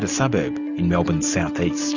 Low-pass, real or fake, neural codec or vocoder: 7.2 kHz; real; none